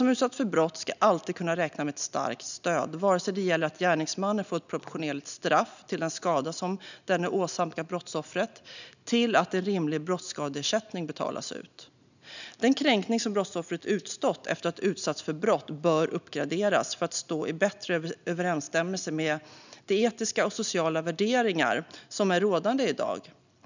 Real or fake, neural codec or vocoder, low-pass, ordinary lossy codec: real; none; 7.2 kHz; none